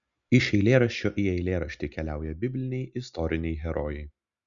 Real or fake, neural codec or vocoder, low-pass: real; none; 7.2 kHz